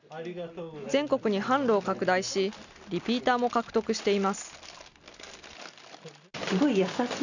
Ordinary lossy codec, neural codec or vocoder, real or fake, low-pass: none; none; real; 7.2 kHz